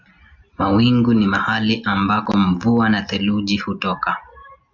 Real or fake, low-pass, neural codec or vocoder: real; 7.2 kHz; none